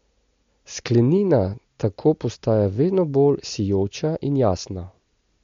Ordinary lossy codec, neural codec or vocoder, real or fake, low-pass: MP3, 48 kbps; none; real; 7.2 kHz